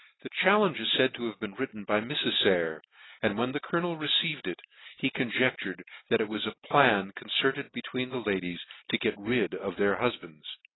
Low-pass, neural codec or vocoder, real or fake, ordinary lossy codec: 7.2 kHz; none; real; AAC, 16 kbps